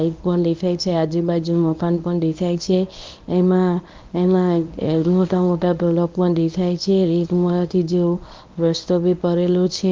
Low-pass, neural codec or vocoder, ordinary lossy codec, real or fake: 7.2 kHz; codec, 24 kHz, 0.9 kbps, WavTokenizer, medium speech release version 1; Opus, 24 kbps; fake